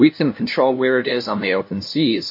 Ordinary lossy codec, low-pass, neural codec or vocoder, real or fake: MP3, 32 kbps; 5.4 kHz; codec, 16 kHz, 0.5 kbps, FunCodec, trained on LibriTTS, 25 frames a second; fake